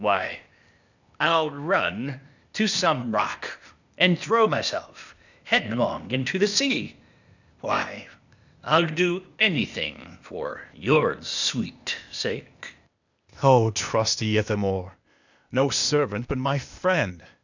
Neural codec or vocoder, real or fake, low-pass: codec, 16 kHz, 0.8 kbps, ZipCodec; fake; 7.2 kHz